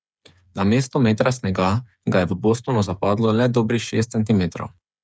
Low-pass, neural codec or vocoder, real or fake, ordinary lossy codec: none; codec, 16 kHz, 8 kbps, FreqCodec, smaller model; fake; none